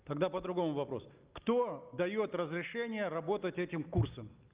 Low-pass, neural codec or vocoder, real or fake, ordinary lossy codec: 3.6 kHz; none; real; Opus, 32 kbps